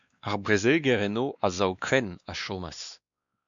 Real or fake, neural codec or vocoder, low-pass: fake; codec, 16 kHz, 2 kbps, X-Codec, WavLM features, trained on Multilingual LibriSpeech; 7.2 kHz